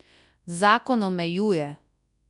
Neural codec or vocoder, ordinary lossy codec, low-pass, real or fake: codec, 24 kHz, 0.9 kbps, WavTokenizer, large speech release; none; 10.8 kHz; fake